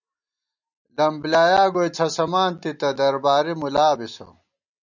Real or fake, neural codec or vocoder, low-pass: real; none; 7.2 kHz